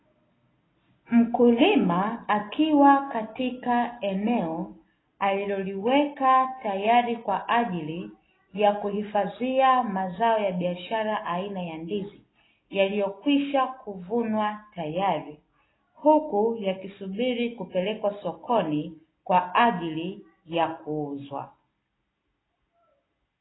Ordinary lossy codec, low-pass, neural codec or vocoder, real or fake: AAC, 16 kbps; 7.2 kHz; none; real